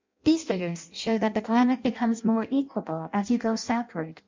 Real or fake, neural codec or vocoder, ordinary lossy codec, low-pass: fake; codec, 16 kHz in and 24 kHz out, 0.6 kbps, FireRedTTS-2 codec; MP3, 64 kbps; 7.2 kHz